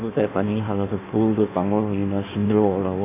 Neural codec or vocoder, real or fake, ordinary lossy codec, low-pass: codec, 16 kHz in and 24 kHz out, 1.1 kbps, FireRedTTS-2 codec; fake; none; 3.6 kHz